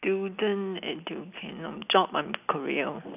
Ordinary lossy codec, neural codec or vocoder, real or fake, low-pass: none; none; real; 3.6 kHz